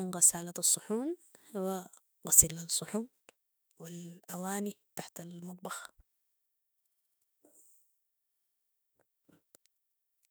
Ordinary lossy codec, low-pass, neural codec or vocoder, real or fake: none; none; autoencoder, 48 kHz, 32 numbers a frame, DAC-VAE, trained on Japanese speech; fake